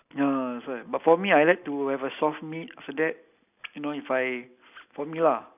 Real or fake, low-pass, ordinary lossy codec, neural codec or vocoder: real; 3.6 kHz; none; none